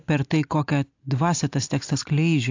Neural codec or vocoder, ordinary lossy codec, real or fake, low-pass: none; AAC, 48 kbps; real; 7.2 kHz